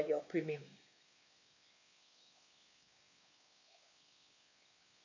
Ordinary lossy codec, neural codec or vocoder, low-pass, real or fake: MP3, 48 kbps; codec, 16 kHz, 2 kbps, X-Codec, WavLM features, trained on Multilingual LibriSpeech; 7.2 kHz; fake